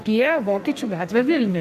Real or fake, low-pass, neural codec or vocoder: fake; 14.4 kHz; codec, 44.1 kHz, 2.6 kbps, SNAC